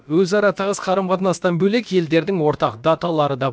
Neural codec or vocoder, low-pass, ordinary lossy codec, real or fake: codec, 16 kHz, about 1 kbps, DyCAST, with the encoder's durations; none; none; fake